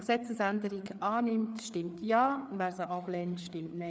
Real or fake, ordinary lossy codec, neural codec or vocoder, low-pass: fake; none; codec, 16 kHz, 4 kbps, FreqCodec, larger model; none